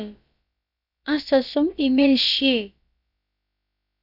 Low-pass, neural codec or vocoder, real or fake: 5.4 kHz; codec, 16 kHz, about 1 kbps, DyCAST, with the encoder's durations; fake